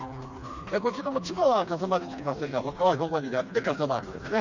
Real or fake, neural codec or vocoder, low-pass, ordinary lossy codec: fake; codec, 16 kHz, 2 kbps, FreqCodec, smaller model; 7.2 kHz; none